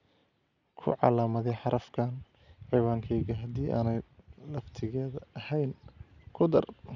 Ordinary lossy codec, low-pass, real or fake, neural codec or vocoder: none; 7.2 kHz; real; none